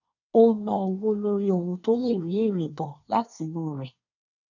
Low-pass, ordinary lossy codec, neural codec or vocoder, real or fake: 7.2 kHz; none; codec, 24 kHz, 1 kbps, SNAC; fake